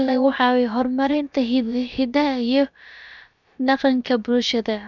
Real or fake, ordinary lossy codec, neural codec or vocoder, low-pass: fake; none; codec, 16 kHz, about 1 kbps, DyCAST, with the encoder's durations; 7.2 kHz